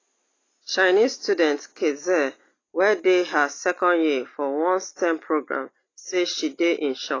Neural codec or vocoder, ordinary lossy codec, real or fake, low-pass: none; AAC, 32 kbps; real; 7.2 kHz